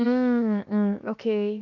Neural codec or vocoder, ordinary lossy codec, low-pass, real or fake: codec, 16 kHz, 0.7 kbps, FocalCodec; none; 7.2 kHz; fake